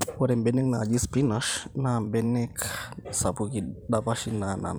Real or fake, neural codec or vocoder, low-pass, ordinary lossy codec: fake; vocoder, 44.1 kHz, 128 mel bands every 512 samples, BigVGAN v2; none; none